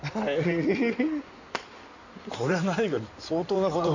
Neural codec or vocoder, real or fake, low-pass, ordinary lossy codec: codec, 16 kHz in and 24 kHz out, 2.2 kbps, FireRedTTS-2 codec; fake; 7.2 kHz; none